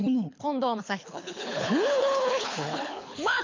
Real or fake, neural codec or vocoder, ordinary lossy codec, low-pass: fake; codec, 24 kHz, 6 kbps, HILCodec; MP3, 64 kbps; 7.2 kHz